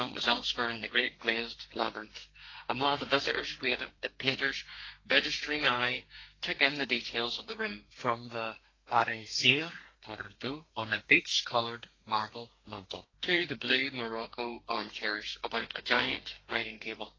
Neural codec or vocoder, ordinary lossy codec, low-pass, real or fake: codec, 32 kHz, 1.9 kbps, SNAC; AAC, 32 kbps; 7.2 kHz; fake